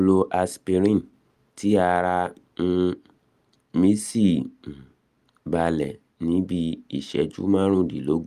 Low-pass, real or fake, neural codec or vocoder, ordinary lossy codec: 14.4 kHz; real; none; Opus, 32 kbps